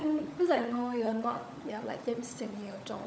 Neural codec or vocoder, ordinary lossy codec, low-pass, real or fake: codec, 16 kHz, 16 kbps, FunCodec, trained on LibriTTS, 50 frames a second; none; none; fake